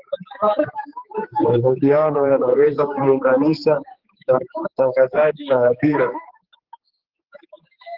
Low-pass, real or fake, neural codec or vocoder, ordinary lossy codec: 5.4 kHz; fake; codec, 16 kHz, 4 kbps, X-Codec, HuBERT features, trained on general audio; Opus, 16 kbps